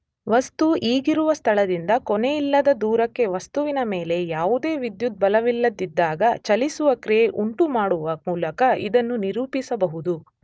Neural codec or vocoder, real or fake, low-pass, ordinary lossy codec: none; real; none; none